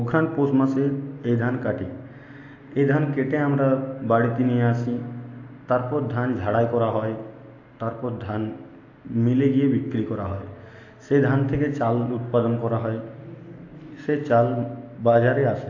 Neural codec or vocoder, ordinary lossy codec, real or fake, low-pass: none; AAC, 48 kbps; real; 7.2 kHz